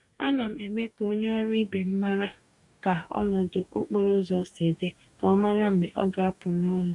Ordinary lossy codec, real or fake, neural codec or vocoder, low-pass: none; fake; codec, 44.1 kHz, 2.6 kbps, DAC; 10.8 kHz